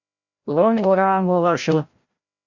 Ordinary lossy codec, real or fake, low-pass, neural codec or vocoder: Opus, 64 kbps; fake; 7.2 kHz; codec, 16 kHz, 0.5 kbps, FreqCodec, larger model